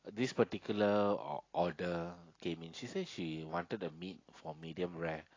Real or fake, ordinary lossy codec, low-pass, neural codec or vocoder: real; AAC, 32 kbps; 7.2 kHz; none